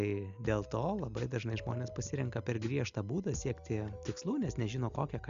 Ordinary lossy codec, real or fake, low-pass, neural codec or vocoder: Opus, 64 kbps; real; 7.2 kHz; none